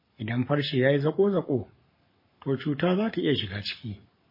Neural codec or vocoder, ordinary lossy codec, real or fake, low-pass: codec, 44.1 kHz, 7.8 kbps, Pupu-Codec; MP3, 24 kbps; fake; 5.4 kHz